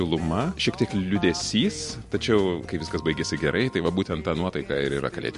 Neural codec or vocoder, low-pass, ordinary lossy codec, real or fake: none; 14.4 kHz; MP3, 48 kbps; real